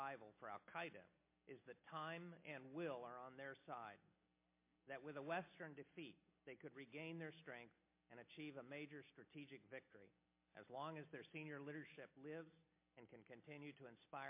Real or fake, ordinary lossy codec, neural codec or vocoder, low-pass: real; MP3, 24 kbps; none; 3.6 kHz